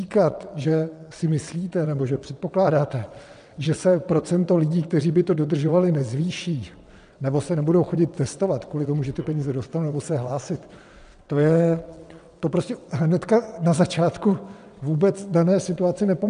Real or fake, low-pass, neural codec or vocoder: fake; 9.9 kHz; vocoder, 22.05 kHz, 80 mel bands, WaveNeXt